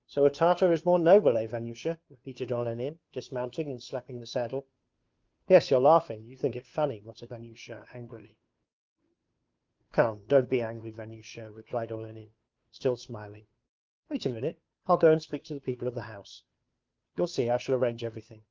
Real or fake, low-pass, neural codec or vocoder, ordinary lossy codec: fake; 7.2 kHz; codec, 16 kHz, 2 kbps, FunCodec, trained on Chinese and English, 25 frames a second; Opus, 24 kbps